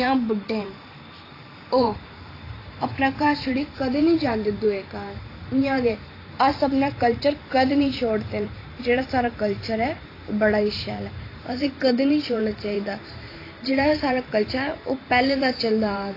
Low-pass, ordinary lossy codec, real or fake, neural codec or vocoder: 5.4 kHz; AAC, 24 kbps; fake; vocoder, 44.1 kHz, 128 mel bands every 512 samples, BigVGAN v2